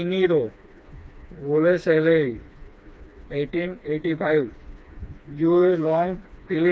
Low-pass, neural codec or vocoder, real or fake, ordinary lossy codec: none; codec, 16 kHz, 2 kbps, FreqCodec, smaller model; fake; none